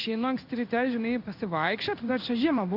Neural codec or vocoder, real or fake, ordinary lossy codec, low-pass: codec, 16 kHz in and 24 kHz out, 1 kbps, XY-Tokenizer; fake; AAC, 48 kbps; 5.4 kHz